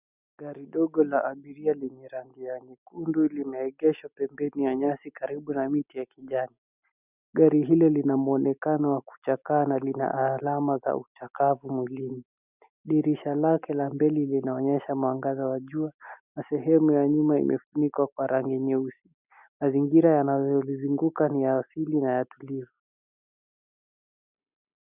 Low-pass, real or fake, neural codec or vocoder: 3.6 kHz; real; none